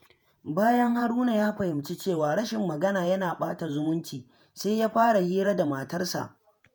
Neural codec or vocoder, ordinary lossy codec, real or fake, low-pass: vocoder, 48 kHz, 128 mel bands, Vocos; none; fake; none